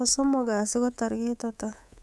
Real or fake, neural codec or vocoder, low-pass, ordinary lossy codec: fake; codec, 24 kHz, 3.1 kbps, DualCodec; none; none